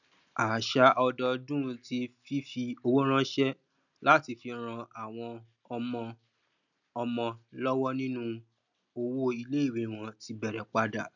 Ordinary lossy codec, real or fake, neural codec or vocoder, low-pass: none; real; none; 7.2 kHz